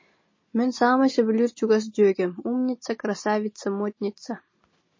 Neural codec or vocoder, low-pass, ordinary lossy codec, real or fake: none; 7.2 kHz; MP3, 32 kbps; real